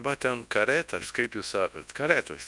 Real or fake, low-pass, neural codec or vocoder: fake; 10.8 kHz; codec, 24 kHz, 0.9 kbps, WavTokenizer, large speech release